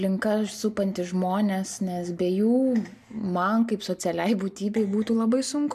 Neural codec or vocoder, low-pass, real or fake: none; 14.4 kHz; real